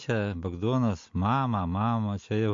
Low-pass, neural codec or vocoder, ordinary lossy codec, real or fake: 7.2 kHz; none; MP3, 48 kbps; real